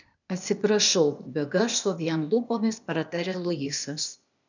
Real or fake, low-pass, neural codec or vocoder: fake; 7.2 kHz; codec, 16 kHz, 0.8 kbps, ZipCodec